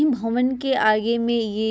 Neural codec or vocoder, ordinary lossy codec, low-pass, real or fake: none; none; none; real